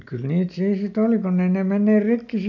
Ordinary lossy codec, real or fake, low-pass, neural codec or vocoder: none; real; 7.2 kHz; none